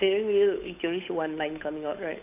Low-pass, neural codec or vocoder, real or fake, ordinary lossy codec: 3.6 kHz; codec, 16 kHz, 8 kbps, FunCodec, trained on Chinese and English, 25 frames a second; fake; AAC, 32 kbps